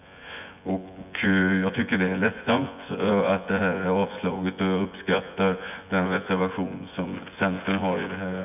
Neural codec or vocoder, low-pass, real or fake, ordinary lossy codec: vocoder, 24 kHz, 100 mel bands, Vocos; 3.6 kHz; fake; none